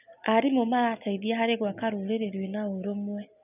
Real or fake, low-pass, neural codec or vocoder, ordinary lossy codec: real; 3.6 kHz; none; none